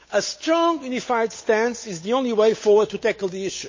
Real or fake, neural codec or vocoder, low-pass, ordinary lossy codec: fake; codec, 16 kHz, 8 kbps, FunCodec, trained on Chinese and English, 25 frames a second; 7.2 kHz; MP3, 32 kbps